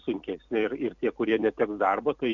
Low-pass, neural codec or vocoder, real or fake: 7.2 kHz; vocoder, 44.1 kHz, 128 mel bands every 256 samples, BigVGAN v2; fake